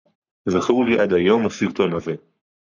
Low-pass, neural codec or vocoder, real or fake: 7.2 kHz; codec, 44.1 kHz, 3.4 kbps, Pupu-Codec; fake